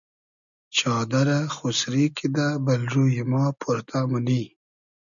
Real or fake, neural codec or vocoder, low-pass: real; none; 7.2 kHz